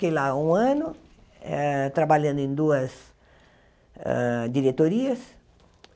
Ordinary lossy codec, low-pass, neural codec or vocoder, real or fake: none; none; none; real